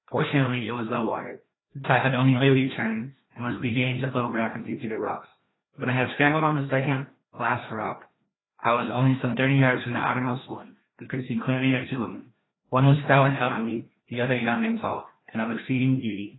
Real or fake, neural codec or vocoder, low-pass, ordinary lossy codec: fake; codec, 16 kHz, 1 kbps, FreqCodec, larger model; 7.2 kHz; AAC, 16 kbps